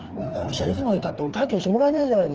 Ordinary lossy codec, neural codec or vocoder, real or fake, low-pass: Opus, 16 kbps; codec, 16 kHz, 2 kbps, FreqCodec, larger model; fake; 7.2 kHz